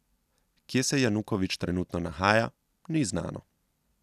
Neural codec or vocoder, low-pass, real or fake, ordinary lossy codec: none; 14.4 kHz; real; none